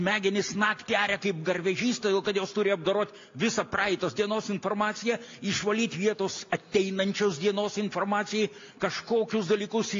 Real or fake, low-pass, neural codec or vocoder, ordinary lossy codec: real; 7.2 kHz; none; AAC, 48 kbps